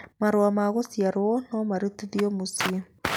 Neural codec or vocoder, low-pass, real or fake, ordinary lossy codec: none; none; real; none